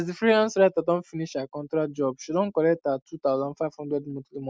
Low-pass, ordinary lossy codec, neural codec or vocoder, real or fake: none; none; none; real